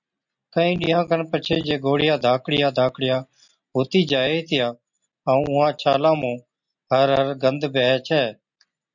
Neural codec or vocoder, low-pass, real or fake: none; 7.2 kHz; real